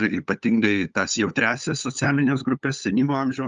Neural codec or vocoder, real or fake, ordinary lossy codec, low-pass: codec, 16 kHz, 8 kbps, FunCodec, trained on LibriTTS, 25 frames a second; fake; Opus, 32 kbps; 7.2 kHz